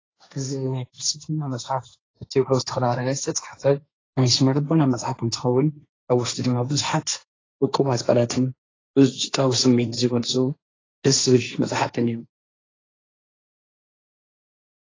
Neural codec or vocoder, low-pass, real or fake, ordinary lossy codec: codec, 16 kHz, 1.1 kbps, Voila-Tokenizer; 7.2 kHz; fake; AAC, 32 kbps